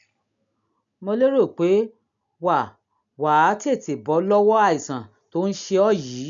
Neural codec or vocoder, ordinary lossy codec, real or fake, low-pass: none; none; real; 7.2 kHz